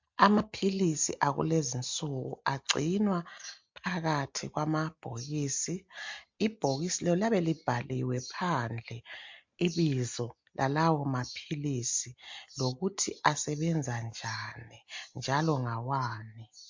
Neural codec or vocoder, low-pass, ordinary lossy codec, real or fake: none; 7.2 kHz; MP3, 48 kbps; real